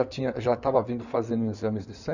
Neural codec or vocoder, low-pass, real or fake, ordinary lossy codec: codec, 16 kHz in and 24 kHz out, 2.2 kbps, FireRedTTS-2 codec; 7.2 kHz; fake; none